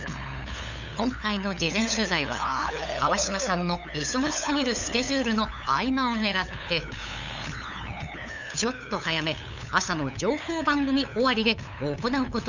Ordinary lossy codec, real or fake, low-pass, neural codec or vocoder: none; fake; 7.2 kHz; codec, 16 kHz, 8 kbps, FunCodec, trained on LibriTTS, 25 frames a second